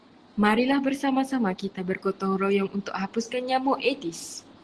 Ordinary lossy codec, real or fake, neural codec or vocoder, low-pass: Opus, 16 kbps; real; none; 10.8 kHz